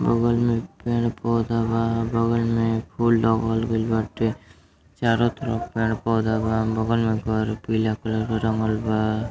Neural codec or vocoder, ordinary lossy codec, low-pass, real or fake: none; none; none; real